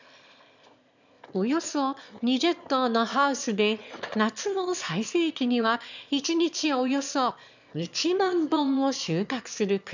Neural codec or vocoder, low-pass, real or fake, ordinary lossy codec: autoencoder, 22.05 kHz, a latent of 192 numbers a frame, VITS, trained on one speaker; 7.2 kHz; fake; none